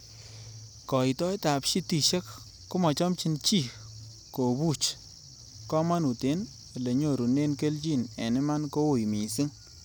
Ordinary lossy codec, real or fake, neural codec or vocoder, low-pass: none; real; none; none